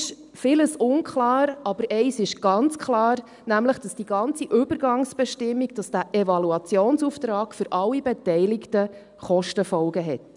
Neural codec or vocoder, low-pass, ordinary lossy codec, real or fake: none; 10.8 kHz; none; real